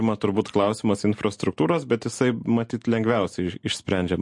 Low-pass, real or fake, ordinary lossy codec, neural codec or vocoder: 10.8 kHz; real; MP3, 48 kbps; none